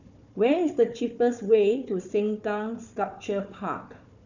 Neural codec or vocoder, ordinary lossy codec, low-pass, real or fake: codec, 16 kHz, 4 kbps, FunCodec, trained on Chinese and English, 50 frames a second; none; 7.2 kHz; fake